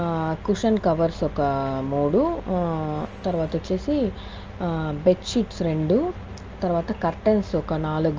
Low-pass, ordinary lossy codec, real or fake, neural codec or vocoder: 7.2 kHz; Opus, 24 kbps; real; none